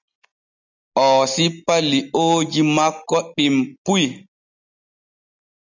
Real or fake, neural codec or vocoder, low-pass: real; none; 7.2 kHz